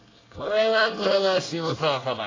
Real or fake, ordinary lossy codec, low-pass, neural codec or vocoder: fake; AAC, 32 kbps; 7.2 kHz; codec, 24 kHz, 1 kbps, SNAC